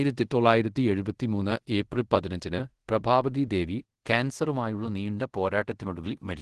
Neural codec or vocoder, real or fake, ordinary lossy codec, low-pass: codec, 24 kHz, 0.5 kbps, DualCodec; fake; Opus, 16 kbps; 10.8 kHz